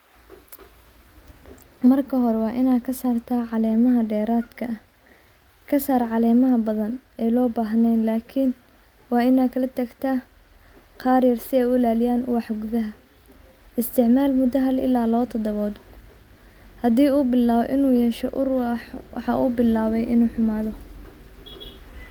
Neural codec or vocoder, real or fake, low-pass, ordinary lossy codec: none; real; 19.8 kHz; Opus, 32 kbps